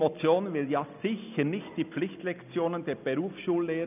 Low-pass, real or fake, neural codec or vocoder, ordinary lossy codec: 3.6 kHz; real; none; none